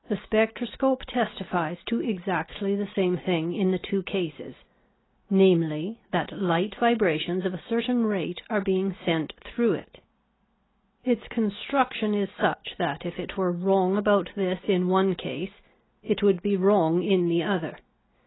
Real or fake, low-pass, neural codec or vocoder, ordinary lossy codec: real; 7.2 kHz; none; AAC, 16 kbps